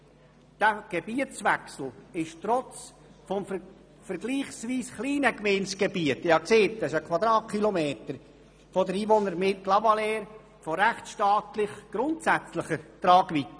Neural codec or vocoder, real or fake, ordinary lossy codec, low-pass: none; real; none; none